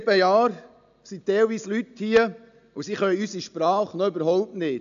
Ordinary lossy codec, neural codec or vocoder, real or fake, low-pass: AAC, 96 kbps; none; real; 7.2 kHz